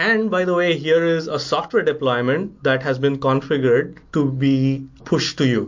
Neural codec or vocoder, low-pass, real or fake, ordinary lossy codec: none; 7.2 kHz; real; MP3, 48 kbps